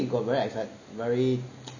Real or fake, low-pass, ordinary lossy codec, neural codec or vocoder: real; 7.2 kHz; none; none